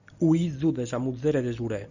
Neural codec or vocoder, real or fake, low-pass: none; real; 7.2 kHz